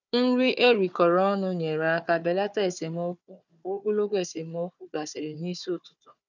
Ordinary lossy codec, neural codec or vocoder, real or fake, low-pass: none; codec, 16 kHz, 4 kbps, FunCodec, trained on Chinese and English, 50 frames a second; fake; 7.2 kHz